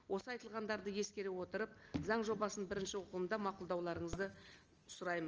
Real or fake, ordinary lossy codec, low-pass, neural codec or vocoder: real; Opus, 24 kbps; 7.2 kHz; none